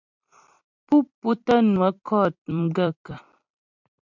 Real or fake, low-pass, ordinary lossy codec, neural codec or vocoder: fake; 7.2 kHz; MP3, 64 kbps; vocoder, 44.1 kHz, 128 mel bands every 256 samples, BigVGAN v2